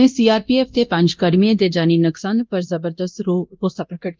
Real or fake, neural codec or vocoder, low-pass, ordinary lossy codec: fake; codec, 24 kHz, 0.9 kbps, DualCodec; 7.2 kHz; Opus, 24 kbps